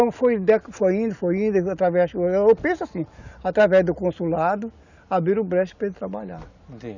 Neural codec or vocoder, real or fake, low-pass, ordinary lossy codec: none; real; 7.2 kHz; none